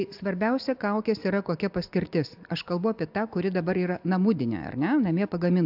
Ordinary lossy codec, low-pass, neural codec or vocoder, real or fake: AAC, 48 kbps; 5.4 kHz; none; real